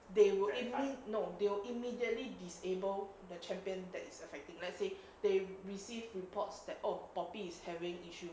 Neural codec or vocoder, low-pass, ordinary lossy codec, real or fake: none; none; none; real